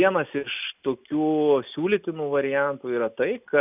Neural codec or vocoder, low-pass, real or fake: none; 3.6 kHz; real